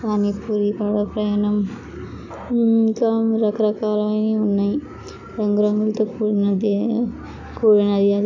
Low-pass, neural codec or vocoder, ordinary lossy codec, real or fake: 7.2 kHz; none; none; real